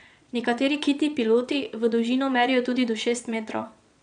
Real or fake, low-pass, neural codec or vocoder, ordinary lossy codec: fake; 9.9 kHz; vocoder, 22.05 kHz, 80 mel bands, Vocos; none